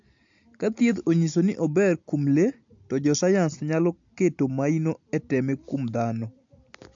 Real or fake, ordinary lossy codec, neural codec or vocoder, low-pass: real; AAC, 64 kbps; none; 7.2 kHz